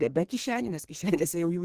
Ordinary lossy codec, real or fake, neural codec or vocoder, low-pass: Opus, 16 kbps; fake; codec, 32 kHz, 1.9 kbps, SNAC; 14.4 kHz